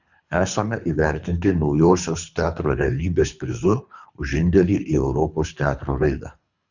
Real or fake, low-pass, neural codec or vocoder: fake; 7.2 kHz; codec, 24 kHz, 3 kbps, HILCodec